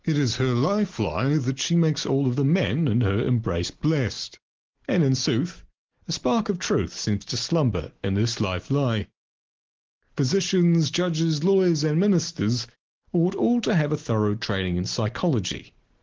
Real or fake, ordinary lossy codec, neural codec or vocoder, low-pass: real; Opus, 16 kbps; none; 7.2 kHz